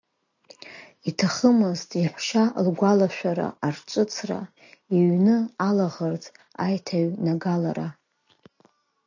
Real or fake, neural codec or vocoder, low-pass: real; none; 7.2 kHz